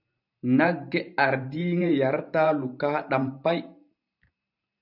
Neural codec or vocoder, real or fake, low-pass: vocoder, 44.1 kHz, 128 mel bands every 512 samples, BigVGAN v2; fake; 5.4 kHz